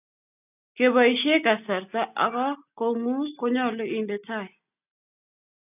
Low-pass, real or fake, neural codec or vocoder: 3.6 kHz; real; none